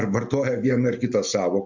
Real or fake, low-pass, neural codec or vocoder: real; 7.2 kHz; none